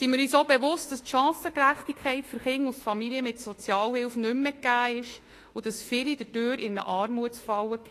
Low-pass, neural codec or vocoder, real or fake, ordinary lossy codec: 14.4 kHz; autoencoder, 48 kHz, 32 numbers a frame, DAC-VAE, trained on Japanese speech; fake; AAC, 48 kbps